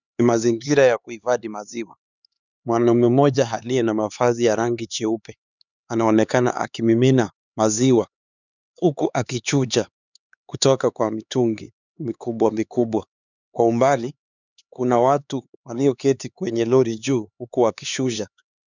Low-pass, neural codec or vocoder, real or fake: 7.2 kHz; codec, 16 kHz, 4 kbps, X-Codec, HuBERT features, trained on LibriSpeech; fake